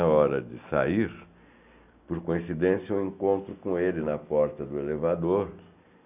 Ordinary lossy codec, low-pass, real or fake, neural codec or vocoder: none; 3.6 kHz; real; none